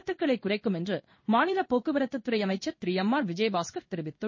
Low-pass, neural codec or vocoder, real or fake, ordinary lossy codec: 7.2 kHz; codec, 16 kHz in and 24 kHz out, 1 kbps, XY-Tokenizer; fake; MP3, 32 kbps